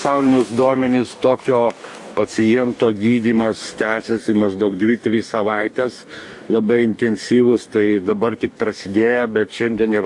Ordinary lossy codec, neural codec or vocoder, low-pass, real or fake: AAC, 64 kbps; codec, 44.1 kHz, 2.6 kbps, DAC; 10.8 kHz; fake